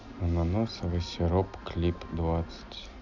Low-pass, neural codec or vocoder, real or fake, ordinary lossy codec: 7.2 kHz; none; real; none